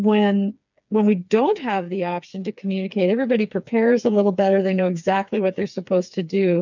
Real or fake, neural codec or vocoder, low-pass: fake; codec, 16 kHz, 4 kbps, FreqCodec, smaller model; 7.2 kHz